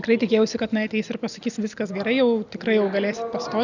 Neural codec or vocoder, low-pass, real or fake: none; 7.2 kHz; real